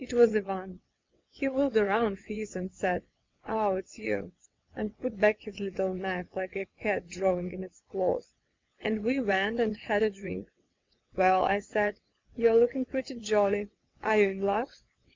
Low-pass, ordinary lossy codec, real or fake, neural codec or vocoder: 7.2 kHz; MP3, 64 kbps; fake; vocoder, 22.05 kHz, 80 mel bands, WaveNeXt